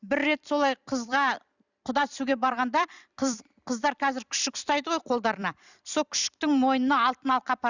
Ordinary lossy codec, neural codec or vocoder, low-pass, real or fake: none; vocoder, 44.1 kHz, 128 mel bands every 256 samples, BigVGAN v2; 7.2 kHz; fake